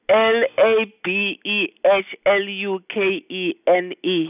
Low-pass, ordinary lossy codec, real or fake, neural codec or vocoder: 3.6 kHz; none; real; none